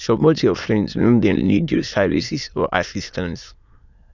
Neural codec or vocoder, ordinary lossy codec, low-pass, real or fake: autoencoder, 22.05 kHz, a latent of 192 numbers a frame, VITS, trained on many speakers; none; 7.2 kHz; fake